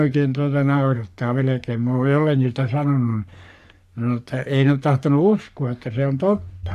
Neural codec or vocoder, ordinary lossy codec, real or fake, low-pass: codec, 44.1 kHz, 3.4 kbps, Pupu-Codec; none; fake; 14.4 kHz